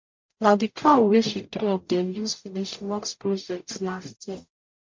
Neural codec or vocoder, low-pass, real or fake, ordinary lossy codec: codec, 44.1 kHz, 0.9 kbps, DAC; 7.2 kHz; fake; MP3, 48 kbps